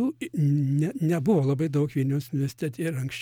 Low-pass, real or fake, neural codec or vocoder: 19.8 kHz; real; none